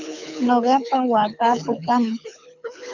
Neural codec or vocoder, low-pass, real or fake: codec, 24 kHz, 6 kbps, HILCodec; 7.2 kHz; fake